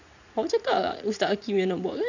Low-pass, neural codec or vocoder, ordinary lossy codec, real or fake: 7.2 kHz; vocoder, 44.1 kHz, 80 mel bands, Vocos; none; fake